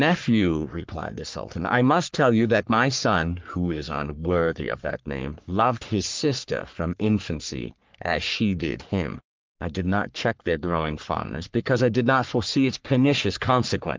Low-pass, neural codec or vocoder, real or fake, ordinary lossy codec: 7.2 kHz; codec, 44.1 kHz, 3.4 kbps, Pupu-Codec; fake; Opus, 24 kbps